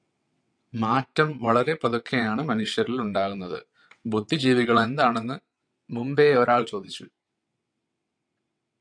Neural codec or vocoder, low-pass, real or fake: vocoder, 22.05 kHz, 80 mel bands, WaveNeXt; 9.9 kHz; fake